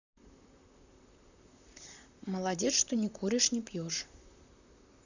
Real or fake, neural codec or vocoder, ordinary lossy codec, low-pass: fake; vocoder, 44.1 kHz, 128 mel bands, Pupu-Vocoder; none; 7.2 kHz